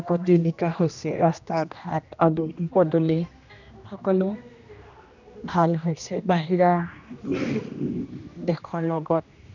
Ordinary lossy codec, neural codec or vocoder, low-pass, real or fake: none; codec, 16 kHz, 1 kbps, X-Codec, HuBERT features, trained on general audio; 7.2 kHz; fake